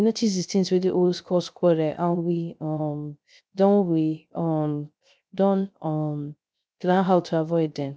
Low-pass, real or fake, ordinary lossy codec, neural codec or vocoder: none; fake; none; codec, 16 kHz, 0.3 kbps, FocalCodec